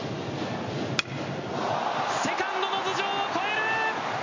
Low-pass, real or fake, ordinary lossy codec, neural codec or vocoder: 7.2 kHz; real; MP3, 32 kbps; none